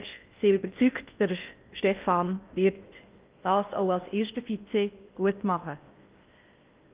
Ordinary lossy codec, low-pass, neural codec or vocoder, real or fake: Opus, 24 kbps; 3.6 kHz; codec, 16 kHz in and 24 kHz out, 0.6 kbps, FocalCodec, streaming, 4096 codes; fake